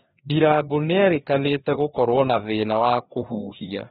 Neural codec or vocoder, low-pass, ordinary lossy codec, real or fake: codec, 16 kHz, 2 kbps, FreqCodec, larger model; 7.2 kHz; AAC, 16 kbps; fake